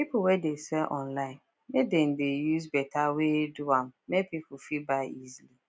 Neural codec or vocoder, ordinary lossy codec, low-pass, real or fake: none; none; none; real